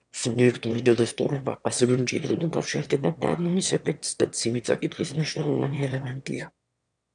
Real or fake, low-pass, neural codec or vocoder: fake; 9.9 kHz; autoencoder, 22.05 kHz, a latent of 192 numbers a frame, VITS, trained on one speaker